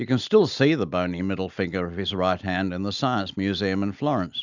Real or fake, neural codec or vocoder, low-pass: real; none; 7.2 kHz